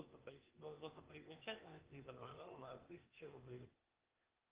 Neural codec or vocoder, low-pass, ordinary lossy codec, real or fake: codec, 16 kHz, 0.8 kbps, ZipCodec; 3.6 kHz; Opus, 32 kbps; fake